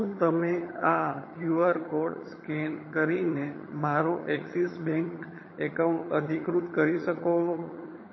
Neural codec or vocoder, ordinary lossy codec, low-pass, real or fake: vocoder, 22.05 kHz, 80 mel bands, HiFi-GAN; MP3, 24 kbps; 7.2 kHz; fake